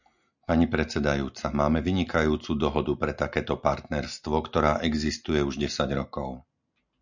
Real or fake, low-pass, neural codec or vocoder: real; 7.2 kHz; none